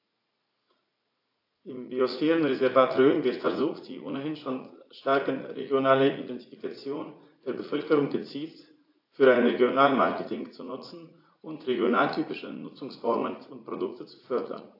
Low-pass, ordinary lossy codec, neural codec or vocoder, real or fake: 5.4 kHz; AAC, 32 kbps; vocoder, 44.1 kHz, 80 mel bands, Vocos; fake